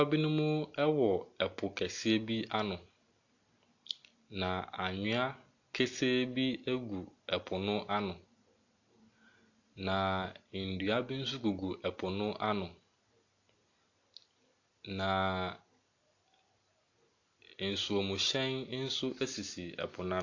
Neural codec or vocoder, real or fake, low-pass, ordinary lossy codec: none; real; 7.2 kHz; AAC, 48 kbps